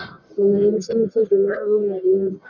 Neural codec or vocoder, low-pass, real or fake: codec, 44.1 kHz, 1.7 kbps, Pupu-Codec; 7.2 kHz; fake